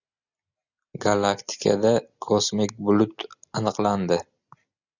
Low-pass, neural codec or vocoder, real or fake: 7.2 kHz; none; real